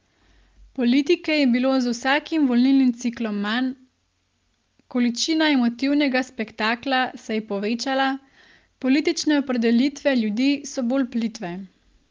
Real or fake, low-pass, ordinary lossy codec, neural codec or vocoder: real; 7.2 kHz; Opus, 32 kbps; none